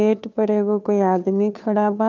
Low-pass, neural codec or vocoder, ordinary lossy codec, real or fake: 7.2 kHz; codec, 16 kHz, 2 kbps, FunCodec, trained on Chinese and English, 25 frames a second; none; fake